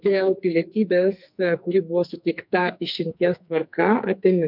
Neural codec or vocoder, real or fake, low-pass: codec, 44.1 kHz, 2.6 kbps, SNAC; fake; 5.4 kHz